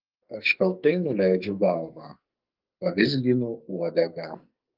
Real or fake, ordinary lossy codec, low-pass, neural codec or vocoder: fake; Opus, 24 kbps; 5.4 kHz; codec, 44.1 kHz, 2.6 kbps, SNAC